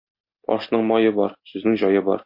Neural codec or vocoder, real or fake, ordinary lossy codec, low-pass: none; real; MP3, 32 kbps; 5.4 kHz